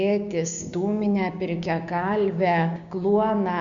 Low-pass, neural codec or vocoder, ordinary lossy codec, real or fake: 7.2 kHz; codec, 16 kHz, 6 kbps, DAC; MP3, 96 kbps; fake